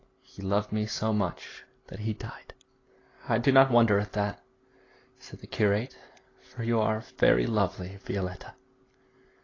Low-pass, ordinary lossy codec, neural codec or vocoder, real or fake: 7.2 kHz; AAC, 32 kbps; none; real